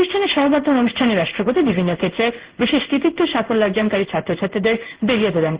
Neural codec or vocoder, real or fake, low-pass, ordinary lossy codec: codec, 16 kHz in and 24 kHz out, 1 kbps, XY-Tokenizer; fake; 3.6 kHz; Opus, 16 kbps